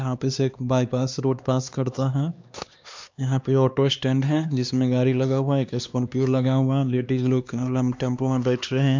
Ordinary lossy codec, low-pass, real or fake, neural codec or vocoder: MP3, 64 kbps; 7.2 kHz; fake; codec, 16 kHz, 2 kbps, X-Codec, HuBERT features, trained on LibriSpeech